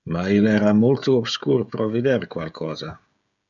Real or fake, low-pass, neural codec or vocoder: fake; 7.2 kHz; codec, 16 kHz, 16 kbps, FreqCodec, smaller model